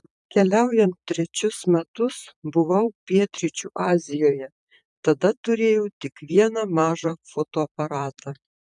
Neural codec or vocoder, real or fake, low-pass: vocoder, 44.1 kHz, 128 mel bands, Pupu-Vocoder; fake; 10.8 kHz